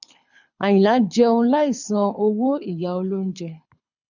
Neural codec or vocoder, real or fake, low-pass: codec, 24 kHz, 6 kbps, HILCodec; fake; 7.2 kHz